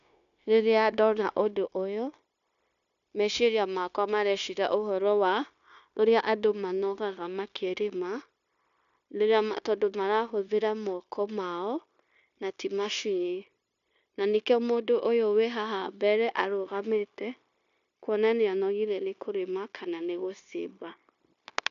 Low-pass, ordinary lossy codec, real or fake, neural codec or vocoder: 7.2 kHz; none; fake; codec, 16 kHz, 0.9 kbps, LongCat-Audio-Codec